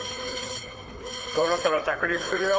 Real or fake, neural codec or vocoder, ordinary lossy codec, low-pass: fake; codec, 16 kHz, 4 kbps, FreqCodec, larger model; none; none